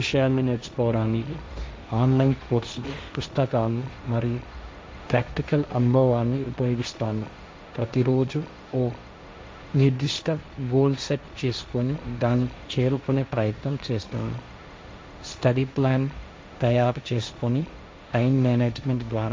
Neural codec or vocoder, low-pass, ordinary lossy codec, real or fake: codec, 16 kHz, 1.1 kbps, Voila-Tokenizer; none; none; fake